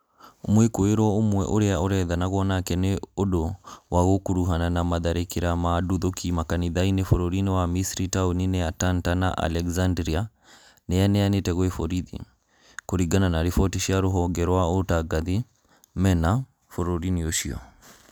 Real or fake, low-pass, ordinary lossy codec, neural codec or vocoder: real; none; none; none